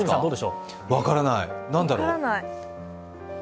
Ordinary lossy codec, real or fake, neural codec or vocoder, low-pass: none; real; none; none